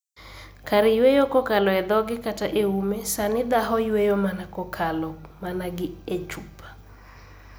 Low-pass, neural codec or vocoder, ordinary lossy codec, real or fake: none; none; none; real